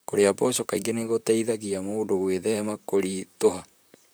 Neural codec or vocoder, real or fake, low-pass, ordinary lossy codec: vocoder, 44.1 kHz, 128 mel bands, Pupu-Vocoder; fake; none; none